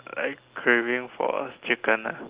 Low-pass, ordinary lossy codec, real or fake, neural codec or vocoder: 3.6 kHz; Opus, 16 kbps; real; none